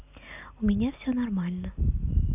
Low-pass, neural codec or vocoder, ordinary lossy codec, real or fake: 3.6 kHz; none; none; real